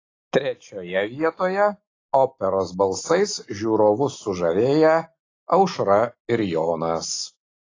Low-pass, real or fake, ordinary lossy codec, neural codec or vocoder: 7.2 kHz; real; AAC, 32 kbps; none